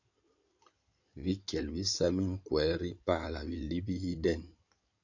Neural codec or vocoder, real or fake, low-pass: codec, 16 kHz in and 24 kHz out, 2.2 kbps, FireRedTTS-2 codec; fake; 7.2 kHz